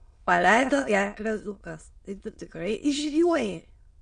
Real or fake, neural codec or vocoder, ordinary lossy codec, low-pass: fake; autoencoder, 22.05 kHz, a latent of 192 numbers a frame, VITS, trained on many speakers; MP3, 48 kbps; 9.9 kHz